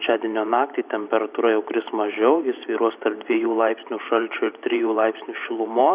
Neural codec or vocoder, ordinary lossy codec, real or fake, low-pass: vocoder, 44.1 kHz, 128 mel bands every 512 samples, BigVGAN v2; Opus, 64 kbps; fake; 3.6 kHz